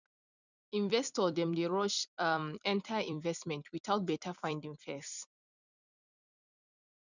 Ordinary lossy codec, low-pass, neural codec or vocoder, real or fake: none; 7.2 kHz; vocoder, 44.1 kHz, 128 mel bands every 256 samples, BigVGAN v2; fake